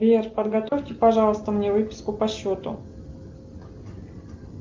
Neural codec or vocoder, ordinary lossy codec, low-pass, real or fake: none; Opus, 24 kbps; 7.2 kHz; real